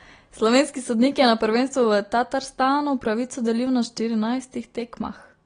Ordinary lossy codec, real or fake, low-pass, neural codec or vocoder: AAC, 32 kbps; real; 9.9 kHz; none